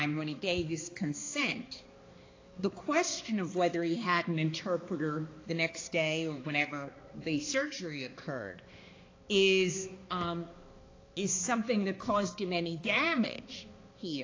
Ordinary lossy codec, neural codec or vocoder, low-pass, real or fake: AAC, 32 kbps; codec, 16 kHz, 2 kbps, X-Codec, HuBERT features, trained on balanced general audio; 7.2 kHz; fake